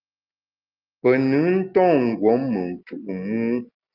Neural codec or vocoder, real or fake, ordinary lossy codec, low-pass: none; real; Opus, 32 kbps; 5.4 kHz